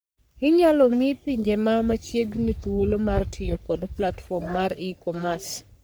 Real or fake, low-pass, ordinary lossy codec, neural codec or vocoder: fake; none; none; codec, 44.1 kHz, 3.4 kbps, Pupu-Codec